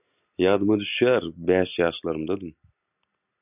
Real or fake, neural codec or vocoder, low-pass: real; none; 3.6 kHz